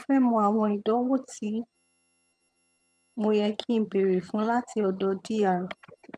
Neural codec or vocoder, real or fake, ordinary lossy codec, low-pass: vocoder, 22.05 kHz, 80 mel bands, HiFi-GAN; fake; none; none